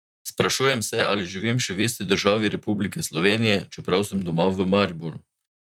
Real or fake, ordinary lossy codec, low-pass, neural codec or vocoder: fake; none; 19.8 kHz; vocoder, 44.1 kHz, 128 mel bands, Pupu-Vocoder